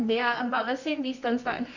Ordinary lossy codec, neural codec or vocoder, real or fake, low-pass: none; codec, 24 kHz, 0.9 kbps, WavTokenizer, medium music audio release; fake; 7.2 kHz